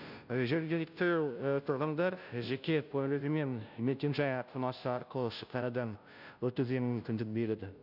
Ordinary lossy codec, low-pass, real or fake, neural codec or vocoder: none; 5.4 kHz; fake; codec, 16 kHz, 0.5 kbps, FunCodec, trained on Chinese and English, 25 frames a second